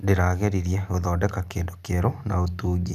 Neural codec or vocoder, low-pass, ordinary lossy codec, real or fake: none; 14.4 kHz; none; real